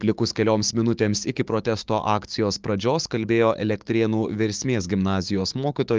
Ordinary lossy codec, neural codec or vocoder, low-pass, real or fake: Opus, 32 kbps; codec, 16 kHz, 6 kbps, DAC; 7.2 kHz; fake